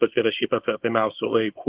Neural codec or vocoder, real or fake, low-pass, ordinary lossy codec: codec, 24 kHz, 0.9 kbps, WavTokenizer, small release; fake; 3.6 kHz; Opus, 16 kbps